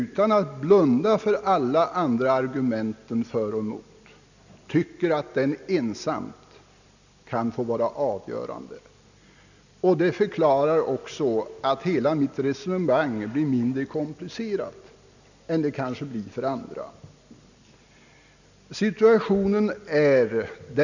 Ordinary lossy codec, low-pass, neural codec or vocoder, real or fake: none; 7.2 kHz; none; real